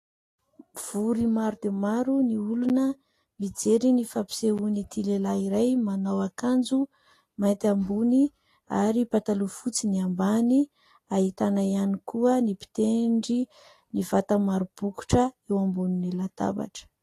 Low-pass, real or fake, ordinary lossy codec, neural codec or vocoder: 14.4 kHz; real; AAC, 48 kbps; none